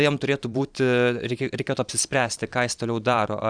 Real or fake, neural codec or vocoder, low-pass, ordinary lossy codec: fake; vocoder, 44.1 kHz, 128 mel bands every 512 samples, BigVGAN v2; 9.9 kHz; Opus, 64 kbps